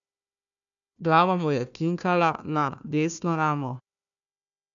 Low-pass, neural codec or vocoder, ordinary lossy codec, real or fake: 7.2 kHz; codec, 16 kHz, 1 kbps, FunCodec, trained on Chinese and English, 50 frames a second; none; fake